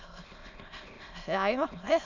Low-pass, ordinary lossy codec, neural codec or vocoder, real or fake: 7.2 kHz; none; autoencoder, 22.05 kHz, a latent of 192 numbers a frame, VITS, trained on many speakers; fake